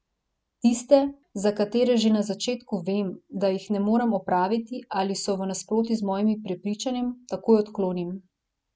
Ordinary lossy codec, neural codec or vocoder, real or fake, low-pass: none; none; real; none